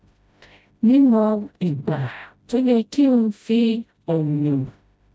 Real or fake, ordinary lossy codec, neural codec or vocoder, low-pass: fake; none; codec, 16 kHz, 0.5 kbps, FreqCodec, smaller model; none